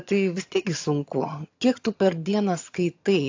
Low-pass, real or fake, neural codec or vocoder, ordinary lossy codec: 7.2 kHz; fake; vocoder, 22.05 kHz, 80 mel bands, HiFi-GAN; AAC, 48 kbps